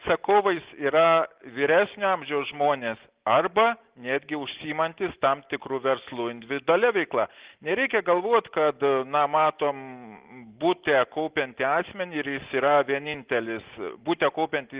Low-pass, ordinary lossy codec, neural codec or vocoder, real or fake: 3.6 kHz; Opus, 16 kbps; none; real